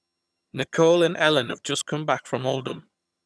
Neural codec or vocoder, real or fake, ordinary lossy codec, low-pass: vocoder, 22.05 kHz, 80 mel bands, HiFi-GAN; fake; none; none